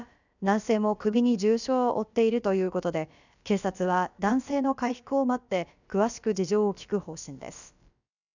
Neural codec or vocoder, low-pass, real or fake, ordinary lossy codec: codec, 16 kHz, about 1 kbps, DyCAST, with the encoder's durations; 7.2 kHz; fake; none